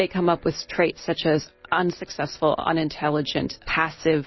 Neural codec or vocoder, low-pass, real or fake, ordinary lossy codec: none; 7.2 kHz; real; MP3, 24 kbps